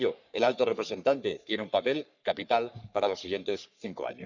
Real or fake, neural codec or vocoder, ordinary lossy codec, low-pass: fake; codec, 44.1 kHz, 3.4 kbps, Pupu-Codec; none; 7.2 kHz